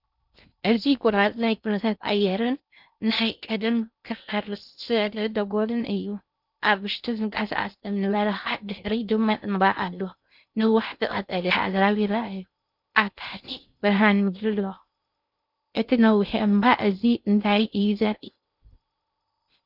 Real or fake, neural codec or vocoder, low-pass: fake; codec, 16 kHz in and 24 kHz out, 0.6 kbps, FocalCodec, streaming, 2048 codes; 5.4 kHz